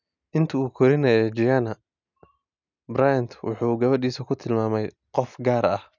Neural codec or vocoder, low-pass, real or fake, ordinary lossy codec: none; 7.2 kHz; real; none